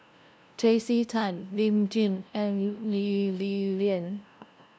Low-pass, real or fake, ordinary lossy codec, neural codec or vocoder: none; fake; none; codec, 16 kHz, 0.5 kbps, FunCodec, trained on LibriTTS, 25 frames a second